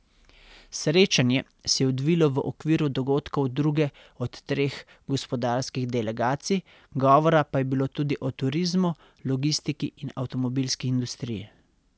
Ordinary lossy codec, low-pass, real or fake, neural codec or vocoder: none; none; real; none